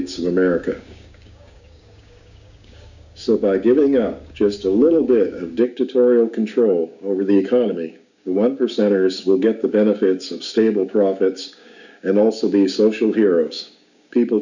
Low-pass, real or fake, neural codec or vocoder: 7.2 kHz; fake; codec, 16 kHz, 6 kbps, DAC